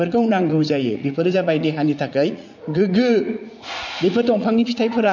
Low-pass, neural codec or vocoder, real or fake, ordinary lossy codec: 7.2 kHz; vocoder, 44.1 kHz, 80 mel bands, Vocos; fake; MP3, 48 kbps